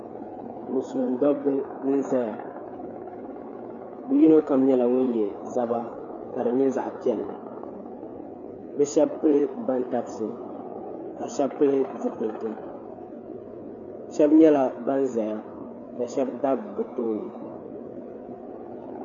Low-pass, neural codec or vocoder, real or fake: 7.2 kHz; codec, 16 kHz, 4 kbps, FreqCodec, larger model; fake